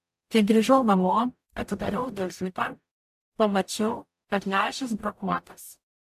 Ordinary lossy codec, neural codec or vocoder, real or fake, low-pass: AAC, 96 kbps; codec, 44.1 kHz, 0.9 kbps, DAC; fake; 14.4 kHz